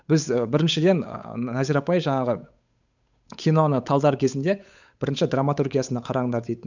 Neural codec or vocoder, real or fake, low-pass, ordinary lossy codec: codec, 16 kHz, 8 kbps, FunCodec, trained on Chinese and English, 25 frames a second; fake; 7.2 kHz; none